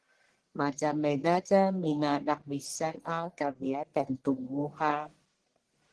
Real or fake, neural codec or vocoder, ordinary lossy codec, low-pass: fake; codec, 44.1 kHz, 1.7 kbps, Pupu-Codec; Opus, 16 kbps; 10.8 kHz